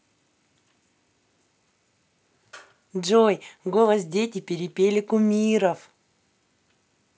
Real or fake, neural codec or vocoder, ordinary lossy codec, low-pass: real; none; none; none